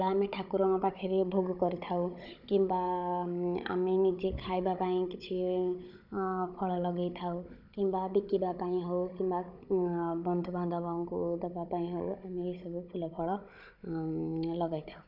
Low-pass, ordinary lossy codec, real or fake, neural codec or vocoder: 5.4 kHz; none; fake; codec, 16 kHz, 16 kbps, FunCodec, trained on Chinese and English, 50 frames a second